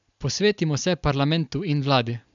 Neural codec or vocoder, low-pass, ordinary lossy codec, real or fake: none; 7.2 kHz; none; real